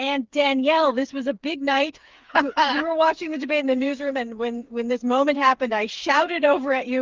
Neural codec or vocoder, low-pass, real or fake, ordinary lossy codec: codec, 16 kHz, 4 kbps, FreqCodec, smaller model; 7.2 kHz; fake; Opus, 32 kbps